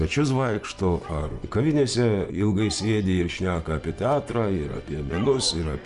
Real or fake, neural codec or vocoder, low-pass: fake; vocoder, 24 kHz, 100 mel bands, Vocos; 10.8 kHz